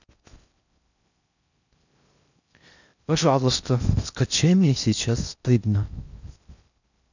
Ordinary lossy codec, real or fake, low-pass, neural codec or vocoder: none; fake; 7.2 kHz; codec, 16 kHz in and 24 kHz out, 0.6 kbps, FocalCodec, streaming, 4096 codes